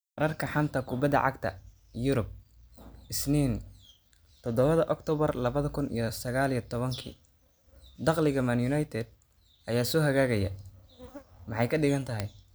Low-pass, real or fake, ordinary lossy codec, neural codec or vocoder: none; real; none; none